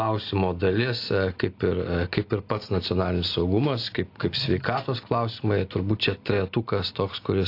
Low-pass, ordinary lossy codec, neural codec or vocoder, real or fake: 5.4 kHz; AAC, 32 kbps; none; real